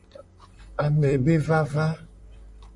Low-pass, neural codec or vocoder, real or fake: 10.8 kHz; vocoder, 44.1 kHz, 128 mel bands, Pupu-Vocoder; fake